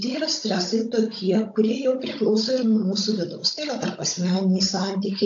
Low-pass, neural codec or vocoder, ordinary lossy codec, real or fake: 7.2 kHz; codec, 16 kHz, 16 kbps, FunCodec, trained on Chinese and English, 50 frames a second; AAC, 48 kbps; fake